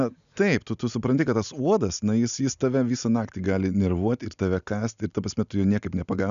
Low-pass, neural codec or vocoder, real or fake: 7.2 kHz; none; real